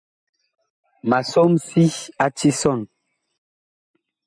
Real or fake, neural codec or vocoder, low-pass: real; none; 9.9 kHz